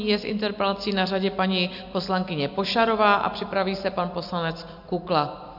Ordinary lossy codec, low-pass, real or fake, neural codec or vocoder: MP3, 48 kbps; 5.4 kHz; real; none